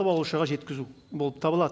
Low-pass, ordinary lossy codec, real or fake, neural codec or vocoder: none; none; real; none